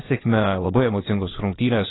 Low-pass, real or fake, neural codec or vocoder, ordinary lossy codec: 7.2 kHz; fake; vocoder, 22.05 kHz, 80 mel bands, WaveNeXt; AAC, 16 kbps